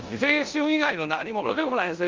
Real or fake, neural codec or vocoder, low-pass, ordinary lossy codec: fake; codec, 16 kHz in and 24 kHz out, 0.9 kbps, LongCat-Audio-Codec, four codebook decoder; 7.2 kHz; Opus, 24 kbps